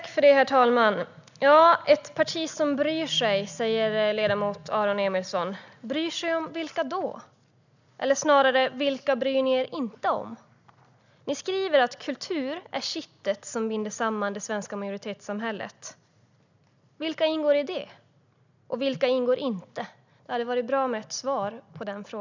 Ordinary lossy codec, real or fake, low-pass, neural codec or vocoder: none; real; 7.2 kHz; none